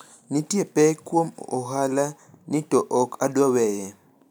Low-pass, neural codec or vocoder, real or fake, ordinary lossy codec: none; none; real; none